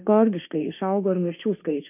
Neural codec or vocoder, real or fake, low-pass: autoencoder, 48 kHz, 32 numbers a frame, DAC-VAE, trained on Japanese speech; fake; 3.6 kHz